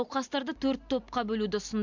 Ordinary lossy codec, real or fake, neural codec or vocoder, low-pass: MP3, 64 kbps; real; none; 7.2 kHz